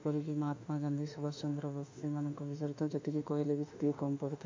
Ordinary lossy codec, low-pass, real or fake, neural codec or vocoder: MP3, 64 kbps; 7.2 kHz; fake; autoencoder, 48 kHz, 32 numbers a frame, DAC-VAE, trained on Japanese speech